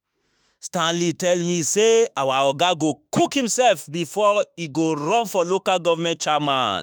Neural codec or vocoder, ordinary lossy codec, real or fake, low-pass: autoencoder, 48 kHz, 32 numbers a frame, DAC-VAE, trained on Japanese speech; none; fake; none